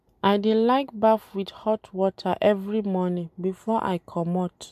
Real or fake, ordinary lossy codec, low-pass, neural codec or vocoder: real; MP3, 96 kbps; 14.4 kHz; none